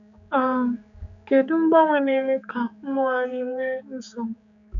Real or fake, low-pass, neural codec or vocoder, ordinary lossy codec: fake; 7.2 kHz; codec, 16 kHz, 4 kbps, X-Codec, HuBERT features, trained on general audio; none